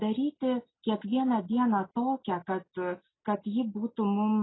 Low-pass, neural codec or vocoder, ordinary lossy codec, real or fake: 7.2 kHz; none; AAC, 16 kbps; real